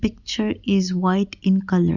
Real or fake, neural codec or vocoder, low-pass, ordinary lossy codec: real; none; 7.2 kHz; Opus, 64 kbps